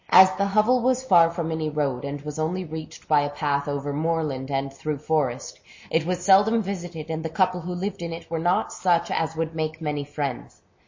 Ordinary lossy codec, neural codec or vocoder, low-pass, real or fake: MP3, 32 kbps; none; 7.2 kHz; real